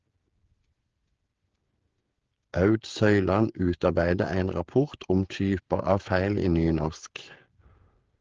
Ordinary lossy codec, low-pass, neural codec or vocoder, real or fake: Opus, 16 kbps; 7.2 kHz; codec, 16 kHz, 16 kbps, FreqCodec, smaller model; fake